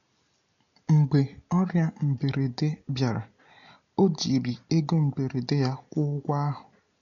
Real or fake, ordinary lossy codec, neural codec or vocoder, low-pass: real; none; none; 7.2 kHz